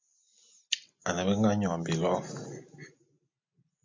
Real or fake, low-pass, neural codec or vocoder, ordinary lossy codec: real; 7.2 kHz; none; MP3, 48 kbps